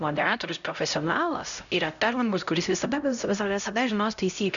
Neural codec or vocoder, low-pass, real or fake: codec, 16 kHz, 0.5 kbps, X-Codec, HuBERT features, trained on LibriSpeech; 7.2 kHz; fake